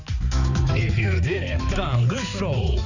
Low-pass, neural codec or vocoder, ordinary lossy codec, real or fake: 7.2 kHz; codec, 16 kHz, 4 kbps, X-Codec, HuBERT features, trained on balanced general audio; none; fake